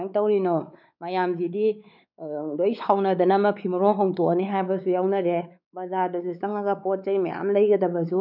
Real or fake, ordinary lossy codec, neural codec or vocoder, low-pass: fake; none; codec, 16 kHz, 4 kbps, X-Codec, WavLM features, trained on Multilingual LibriSpeech; 5.4 kHz